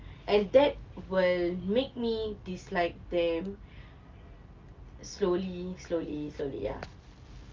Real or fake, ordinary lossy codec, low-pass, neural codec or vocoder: real; Opus, 24 kbps; 7.2 kHz; none